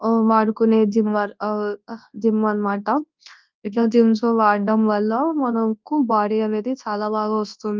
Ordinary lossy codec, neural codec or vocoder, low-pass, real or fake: Opus, 32 kbps; codec, 24 kHz, 0.9 kbps, WavTokenizer, large speech release; 7.2 kHz; fake